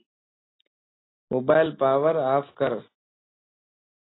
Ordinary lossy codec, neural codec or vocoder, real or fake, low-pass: AAC, 16 kbps; none; real; 7.2 kHz